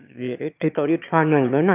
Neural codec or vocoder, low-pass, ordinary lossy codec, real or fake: autoencoder, 22.05 kHz, a latent of 192 numbers a frame, VITS, trained on one speaker; 3.6 kHz; none; fake